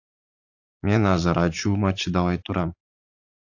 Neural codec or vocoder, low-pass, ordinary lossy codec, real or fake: vocoder, 44.1 kHz, 80 mel bands, Vocos; 7.2 kHz; AAC, 48 kbps; fake